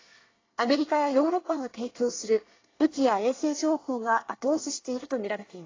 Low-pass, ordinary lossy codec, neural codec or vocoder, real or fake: 7.2 kHz; AAC, 32 kbps; codec, 24 kHz, 1 kbps, SNAC; fake